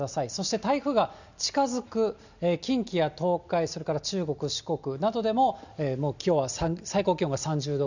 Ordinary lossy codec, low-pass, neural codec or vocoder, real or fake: none; 7.2 kHz; none; real